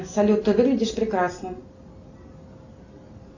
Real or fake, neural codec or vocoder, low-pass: real; none; 7.2 kHz